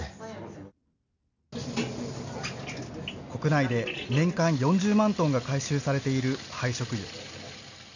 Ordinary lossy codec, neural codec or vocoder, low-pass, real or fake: none; none; 7.2 kHz; real